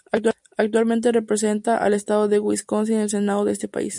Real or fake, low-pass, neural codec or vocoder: real; 10.8 kHz; none